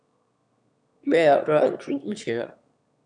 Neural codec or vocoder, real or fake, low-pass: autoencoder, 22.05 kHz, a latent of 192 numbers a frame, VITS, trained on one speaker; fake; 9.9 kHz